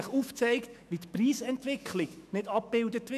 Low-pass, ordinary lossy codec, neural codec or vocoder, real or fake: 14.4 kHz; none; autoencoder, 48 kHz, 128 numbers a frame, DAC-VAE, trained on Japanese speech; fake